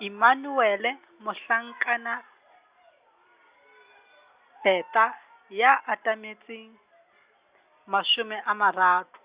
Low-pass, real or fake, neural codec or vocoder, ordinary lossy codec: 3.6 kHz; real; none; Opus, 64 kbps